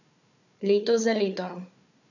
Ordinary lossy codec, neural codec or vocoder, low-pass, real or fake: none; codec, 16 kHz, 4 kbps, FunCodec, trained on Chinese and English, 50 frames a second; 7.2 kHz; fake